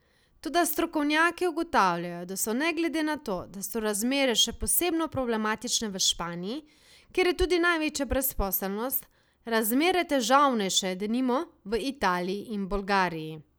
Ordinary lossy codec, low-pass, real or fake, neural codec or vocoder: none; none; real; none